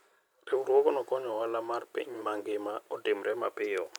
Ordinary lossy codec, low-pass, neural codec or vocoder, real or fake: none; none; none; real